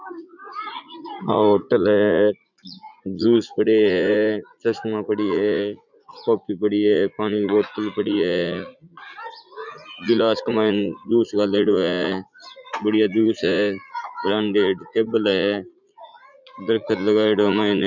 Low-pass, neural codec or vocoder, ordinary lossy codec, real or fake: 7.2 kHz; vocoder, 44.1 kHz, 80 mel bands, Vocos; none; fake